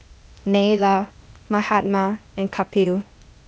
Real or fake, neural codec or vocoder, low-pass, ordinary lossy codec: fake; codec, 16 kHz, 0.8 kbps, ZipCodec; none; none